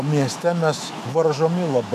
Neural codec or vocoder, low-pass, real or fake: autoencoder, 48 kHz, 128 numbers a frame, DAC-VAE, trained on Japanese speech; 14.4 kHz; fake